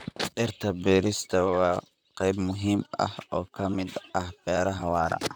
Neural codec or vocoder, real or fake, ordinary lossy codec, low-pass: vocoder, 44.1 kHz, 128 mel bands, Pupu-Vocoder; fake; none; none